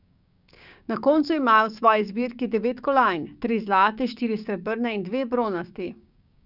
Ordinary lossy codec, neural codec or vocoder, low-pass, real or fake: none; codec, 16 kHz, 6 kbps, DAC; 5.4 kHz; fake